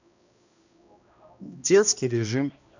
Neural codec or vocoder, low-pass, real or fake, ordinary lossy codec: codec, 16 kHz, 1 kbps, X-Codec, HuBERT features, trained on balanced general audio; 7.2 kHz; fake; none